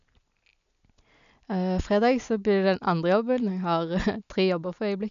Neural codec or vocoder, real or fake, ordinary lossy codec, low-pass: none; real; none; 7.2 kHz